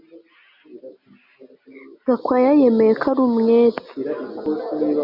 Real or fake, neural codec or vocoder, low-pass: real; none; 5.4 kHz